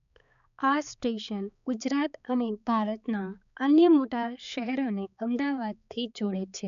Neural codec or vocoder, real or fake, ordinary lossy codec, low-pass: codec, 16 kHz, 4 kbps, X-Codec, HuBERT features, trained on balanced general audio; fake; none; 7.2 kHz